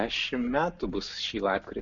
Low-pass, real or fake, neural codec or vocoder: 7.2 kHz; real; none